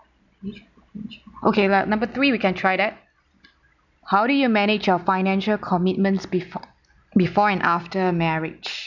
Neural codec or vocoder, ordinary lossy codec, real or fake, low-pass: none; none; real; 7.2 kHz